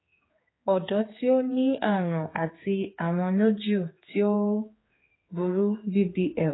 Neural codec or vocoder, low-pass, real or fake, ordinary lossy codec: codec, 16 kHz, 4 kbps, X-Codec, HuBERT features, trained on general audio; 7.2 kHz; fake; AAC, 16 kbps